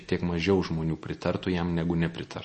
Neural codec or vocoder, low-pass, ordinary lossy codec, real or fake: none; 10.8 kHz; MP3, 32 kbps; real